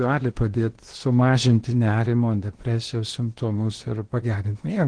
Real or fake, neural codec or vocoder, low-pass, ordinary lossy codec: fake; codec, 16 kHz in and 24 kHz out, 0.8 kbps, FocalCodec, streaming, 65536 codes; 9.9 kHz; Opus, 16 kbps